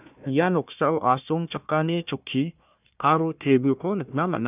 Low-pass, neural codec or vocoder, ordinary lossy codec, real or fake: 3.6 kHz; codec, 16 kHz, 1 kbps, FunCodec, trained on Chinese and English, 50 frames a second; none; fake